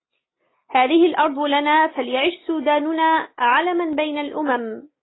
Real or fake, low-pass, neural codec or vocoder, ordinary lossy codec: real; 7.2 kHz; none; AAC, 16 kbps